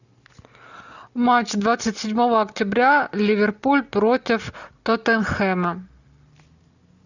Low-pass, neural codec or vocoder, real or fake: 7.2 kHz; none; real